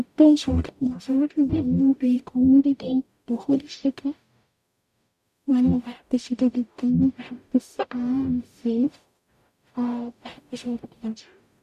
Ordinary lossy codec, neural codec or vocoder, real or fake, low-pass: none; codec, 44.1 kHz, 0.9 kbps, DAC; fake; 14.4 kHz